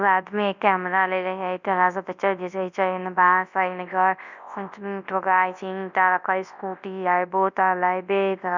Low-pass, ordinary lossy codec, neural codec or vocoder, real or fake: 7.2 kHz; none; codec, 24 kHz, 0.9 kbps, WavTokenizer, large speech release; fake